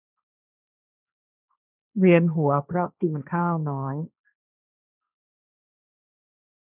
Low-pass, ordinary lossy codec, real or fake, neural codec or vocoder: 3.6 kHz; none; fake; codec, 16 kHz, 1.1 kbps, Voila-Tokenizer